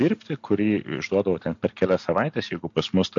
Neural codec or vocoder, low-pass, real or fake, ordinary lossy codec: none; 7.2 kHz; real; MP3, 48 kbps